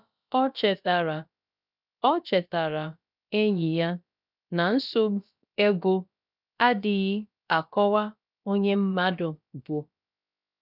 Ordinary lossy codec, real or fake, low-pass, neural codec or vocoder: none; fake; 5.4 kHz; codec, 16 kHz, about 1 kbps, DyCAST, with the encoder's durations